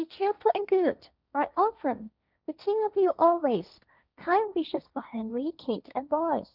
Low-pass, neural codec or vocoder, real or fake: 5.4 kHz; codec, 16 kHz, 1.1 kbps, Voila-Tokenizer; fake